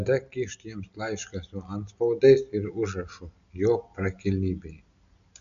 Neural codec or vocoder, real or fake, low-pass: none; real; 7.2 kHz